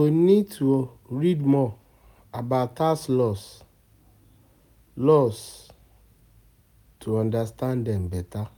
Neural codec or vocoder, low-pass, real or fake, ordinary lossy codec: none; 19.8 kHz; real; none